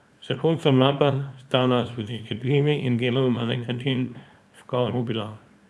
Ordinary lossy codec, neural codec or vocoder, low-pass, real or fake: none; codec, 24 kHz, 0.9 kbps, WavTokenizer, small release; none; fake